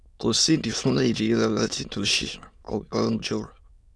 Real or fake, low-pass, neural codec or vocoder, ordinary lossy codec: fake; none; autoencoder, 22.05 kHz, a latent of 192 numbers a frame, VITS, trained on many speakers; none